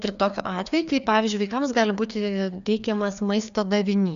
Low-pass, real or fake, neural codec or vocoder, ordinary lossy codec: 7.2 kHz; fake; codec, 16 kHz, 2 kbps, FreqCodec, larger model; AAC, 96 kbps